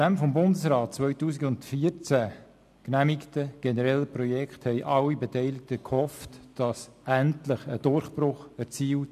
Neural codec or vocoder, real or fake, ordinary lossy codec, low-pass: none; real; MP3, 96 kbps; 14.4 kHz